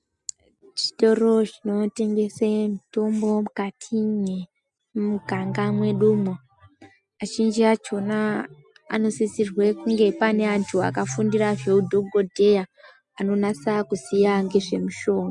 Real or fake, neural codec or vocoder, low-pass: real; none; 10.8 kHz